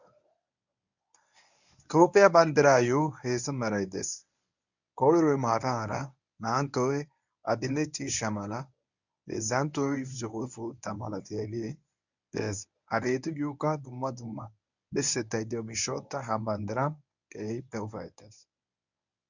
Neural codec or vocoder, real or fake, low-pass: codec, 24 kHz, 0.9 kbps, WavTokenizer, medium speech release version 1; fake; 7.2 kHz